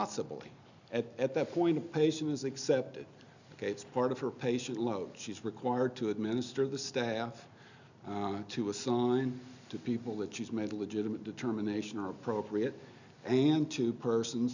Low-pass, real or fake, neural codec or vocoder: 7.2 kHz; real; none